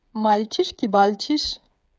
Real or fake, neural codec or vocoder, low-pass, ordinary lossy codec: fake; codec, 16 kHz, 8 kbps, FreqCodec, smaller model; none; none